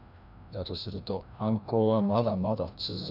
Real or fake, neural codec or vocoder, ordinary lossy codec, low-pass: fake; codec, 16 kHz, 1 kbps, FreqCodec, larger model; none; 5.4 kHz